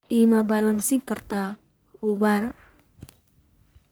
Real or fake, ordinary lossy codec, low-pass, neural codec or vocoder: fake; none; none; codec, 44.1 kHz, 1.7 kbps, Pupu-Codec